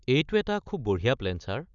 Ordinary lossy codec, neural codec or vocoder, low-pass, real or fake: none; none; 7.2 kHz; real